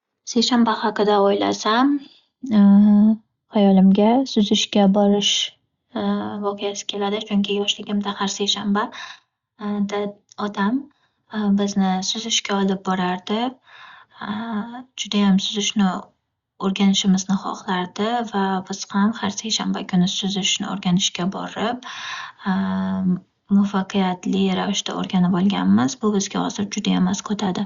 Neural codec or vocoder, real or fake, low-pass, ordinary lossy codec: none; real; 7.2 kHz; Opus, 64 kbps